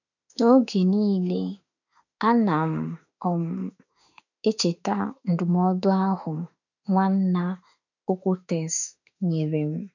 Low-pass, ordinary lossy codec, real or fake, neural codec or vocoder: 7.2 kHz; none; fake; autoencoder, 48 kHz, 32 numbers a frame, DAC-VAE, trained on Japanese speech